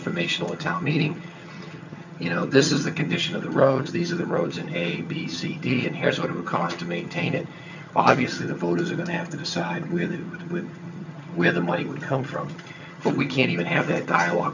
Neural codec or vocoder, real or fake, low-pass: vocoder, 22.05 kHz, 80 mel bands, HiFi-GAN; fake; 7.2 kHz